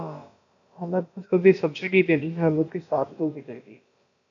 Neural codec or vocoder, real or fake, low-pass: codec, 16 kHz, about 1 kbps, DyCAST, with the encoder's durations; fake; 7.2 kHz